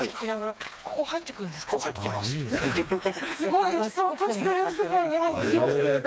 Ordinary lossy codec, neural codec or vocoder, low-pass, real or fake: none; codec, 16 kHz, 2 kbps, FreqCodec, smaller model; none; fake